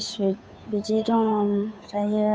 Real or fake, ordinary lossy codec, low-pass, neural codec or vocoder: fake; none; none; codec, 16 kHz, 2 kbps, FunCodec, trained on Chinese and English, 25 frames a second